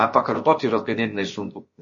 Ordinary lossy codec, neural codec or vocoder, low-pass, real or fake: MP3, 32 kbps; codec, 16 kHz, about 1 kbps, DyCAST, with the encoder's durations; 7.2 kHz; fake